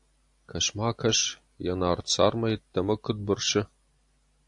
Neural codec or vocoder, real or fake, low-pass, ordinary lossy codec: none; real; 10.8 kHz; AAC, 64 kbps